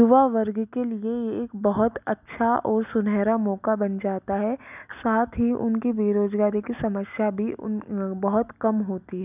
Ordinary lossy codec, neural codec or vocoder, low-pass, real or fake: none; none; 3.6 kHz; real